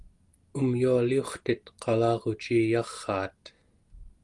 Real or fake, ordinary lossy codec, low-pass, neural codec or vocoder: real; Opus, 24 kbps; 10.8 kHz; none